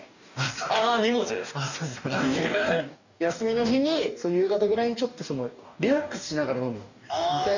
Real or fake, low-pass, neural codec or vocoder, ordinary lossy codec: fake; 7.2 kHz; codec, 44.1 kHz, 2.6 kbps, DAC; none